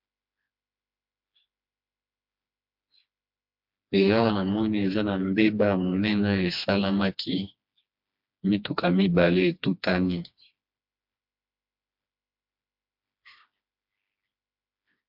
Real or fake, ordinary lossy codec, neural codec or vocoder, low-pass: fake; MP3, 48 kbps; codec, 16 kHz, 2 kbps, FreqCodec, smaller model; 5.4 kHz